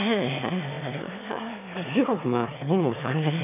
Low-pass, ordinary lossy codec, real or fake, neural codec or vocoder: 3.6 kHz; none; fake; autoencoder, 22.05 kHz, a latent of 192 numbers a frame, VITS, trained on one speaker